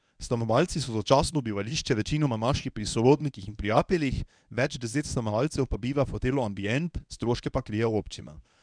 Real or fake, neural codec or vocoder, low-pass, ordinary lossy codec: fake; codec, 24 kHz, 0.9 kbps, WavTokenizer, medium speech release version 1; 9.9 kHz; none